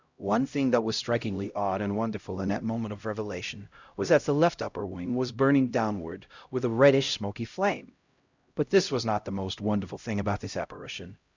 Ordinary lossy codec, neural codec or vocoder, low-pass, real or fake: Opus, 64 kbps; codec, 16 kHz, 0.5 kbps, X-Codec, HuBERT features, trained on LibriSpeech; 7.2 kHz; fake